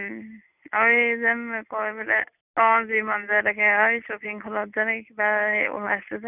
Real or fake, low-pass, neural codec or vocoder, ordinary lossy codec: real; 3.6 kHz; none; none